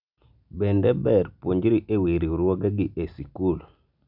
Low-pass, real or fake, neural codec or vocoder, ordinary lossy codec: 5.4 kHz; real; none; none